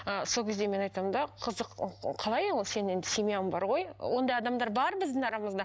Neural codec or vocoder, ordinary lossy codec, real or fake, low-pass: none; none; real; none